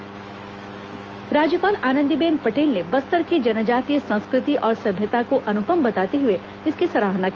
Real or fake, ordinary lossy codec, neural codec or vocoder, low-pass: fake; Opus, 24 kbps; autoencoder, 48 kHz, 128 numbers a frame, DAC-VAE, trained on Japanese speech; 7.2 kHz